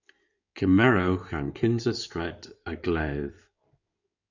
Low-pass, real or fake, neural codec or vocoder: 7.2 kHz; fake; codec, 16 kHz in and 24 kHz out, 2.2 kbps, FireRedTTS-2 codec